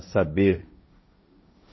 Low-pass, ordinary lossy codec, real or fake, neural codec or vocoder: 7.2 kHz; MP3, 24 kbps; fake; codec, 16 kHz, 1.1 kbps, Voila-Tokenizer